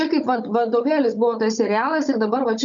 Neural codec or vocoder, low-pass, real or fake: codec, 16 kHz, 16 kbps, FunCodec, trained on Chinese and English, 50 frames a second; 7.2 kHz; fake